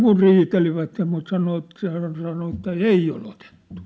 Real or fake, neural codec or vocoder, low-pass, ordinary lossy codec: real; none; none; none